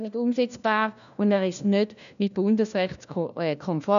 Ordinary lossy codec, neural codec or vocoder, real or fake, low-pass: none; codec, 16 kHz, 1 kbps, FunCodec, trained on LibriTTS, 50 frames a second; fake; 7.2 kHz